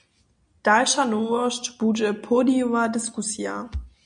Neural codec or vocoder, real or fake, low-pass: none; real; 9.9 kHz